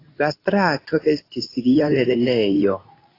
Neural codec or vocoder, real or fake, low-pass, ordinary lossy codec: codec, 24 kHz, 0.9 kbps, WavTokenizer, medium speech release version 2; fake; 5.4 kHz; AAC, 24 kbps